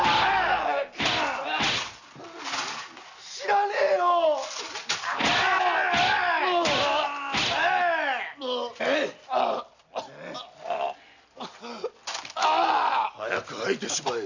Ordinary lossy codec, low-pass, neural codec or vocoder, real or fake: none; 7.2 kHz; none; real